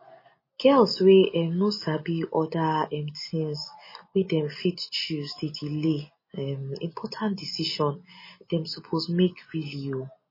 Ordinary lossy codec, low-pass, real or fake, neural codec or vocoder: MP3, 24 kbps; 5.4 kHz; real; none